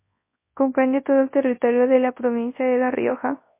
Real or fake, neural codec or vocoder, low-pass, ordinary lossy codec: fake; codec, 24 kHz, 0.9 kbps, WavTokenizer, large speech release; 3.6 kHz; MP3, 24 kbps